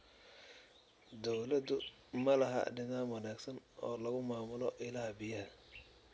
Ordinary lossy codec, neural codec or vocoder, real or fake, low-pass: none; none; real; none